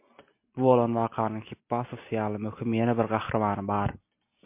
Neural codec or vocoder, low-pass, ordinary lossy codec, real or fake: none; 3.6 kHz; MP3, 24 kbps; real